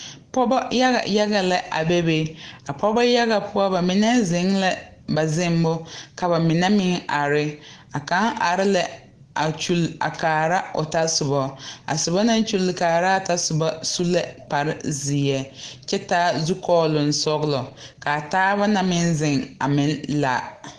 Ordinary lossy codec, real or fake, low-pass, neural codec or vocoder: Opus, 16 kbps; real; 9.9 kHz; none